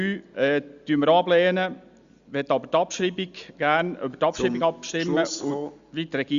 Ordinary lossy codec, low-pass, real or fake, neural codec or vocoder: Opus, 64 kbps; 7.2 kHz; real; none